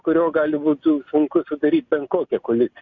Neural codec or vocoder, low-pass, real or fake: none; 7.2 kHz; real